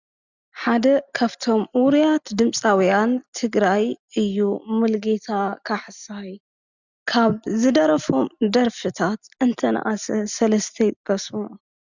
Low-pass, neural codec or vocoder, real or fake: 7.2 kHz; none; real